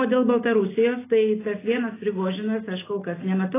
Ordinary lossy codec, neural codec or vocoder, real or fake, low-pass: AAC, 16 kbps; none; real; 3.6 kHz